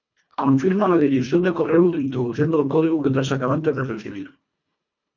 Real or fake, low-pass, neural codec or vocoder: fake; 7.2 kHz; codec, 24 kHz, 1.5 kbps, HILCodec